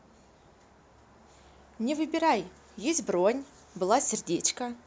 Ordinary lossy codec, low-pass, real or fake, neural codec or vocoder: none; none; real; none